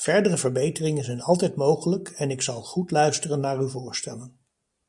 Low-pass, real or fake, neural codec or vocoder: 10.8 kHz; real; none